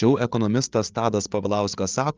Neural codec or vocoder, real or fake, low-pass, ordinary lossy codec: codec, 16 kHz, 8 kbps, FreqCodec, larger model; fake; 7.2 kHz; Opus, 24 kbps